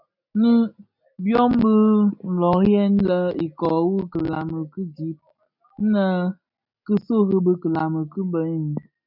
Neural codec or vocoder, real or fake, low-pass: none; real; 5.4 kHz